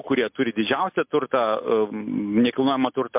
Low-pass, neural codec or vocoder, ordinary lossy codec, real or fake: 3.6 kHz; none; MP3, 32 kbps; real